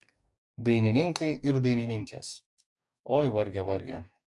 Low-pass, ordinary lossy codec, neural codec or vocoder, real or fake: 10.8 kHz; AAC, 64 kbps; codec, 44.1 kHz, 2.6 kbps, DAC; fake